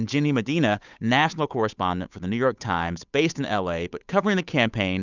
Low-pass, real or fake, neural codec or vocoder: 7.2 kHz; fake; codec, 16 kHz, 8 kbps, FunCodec, trained on Chinese and English, 25 frames a second